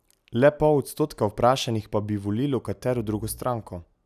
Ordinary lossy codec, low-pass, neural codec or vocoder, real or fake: none; 14.4 kHz; none; real